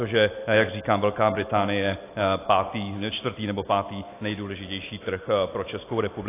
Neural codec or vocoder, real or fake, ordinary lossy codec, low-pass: none; real; AAC, 24 kbps; 3.6 kHz